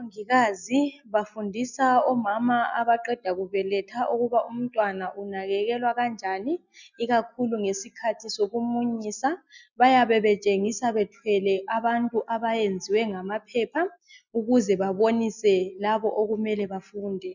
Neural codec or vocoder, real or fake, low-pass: none; real; 7.2 kHz